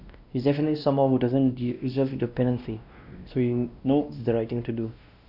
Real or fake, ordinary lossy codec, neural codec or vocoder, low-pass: fake; none; codec, 16 kHz, 1 kbps, X-Codec, WavLM features, trained on Multilingual LibriSpeech; 5.4 kHz